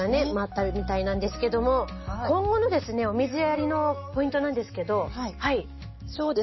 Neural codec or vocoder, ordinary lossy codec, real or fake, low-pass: none; MP3, 24 kbps; real; 7.2 kHz